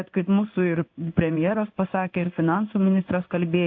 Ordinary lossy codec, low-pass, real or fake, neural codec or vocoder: AAC, 48 kbps; 7.2 kHz; fake; codec, 16 kHz in and 24 kHz out, 1 kbps, XY-Tokenizer